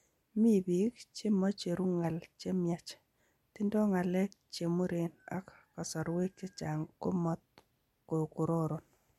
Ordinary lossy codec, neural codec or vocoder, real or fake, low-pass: MP3, 64 kbps; none; real; 19.8 kHz